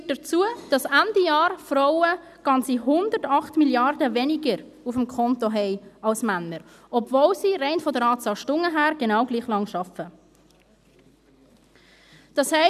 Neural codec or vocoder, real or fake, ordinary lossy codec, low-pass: none; real; none; 14.4 kHz